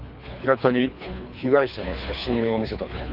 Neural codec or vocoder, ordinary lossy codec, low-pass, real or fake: codec, 24 kHz, 3 kbps, HILCodec; none; 5.4 kHz; fake